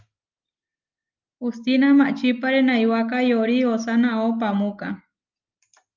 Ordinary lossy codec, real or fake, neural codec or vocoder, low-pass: Opus, 24 kbps; real; none; 7.2 kHz